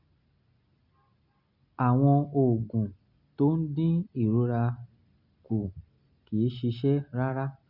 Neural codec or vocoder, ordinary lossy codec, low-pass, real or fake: none; Opus, 64 kbps; 5.4 kHz; real